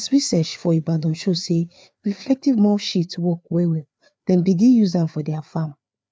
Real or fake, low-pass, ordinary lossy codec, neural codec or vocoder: fake; none; none; codec, 16 kHz, 4 kbps, FreqCodec, larger model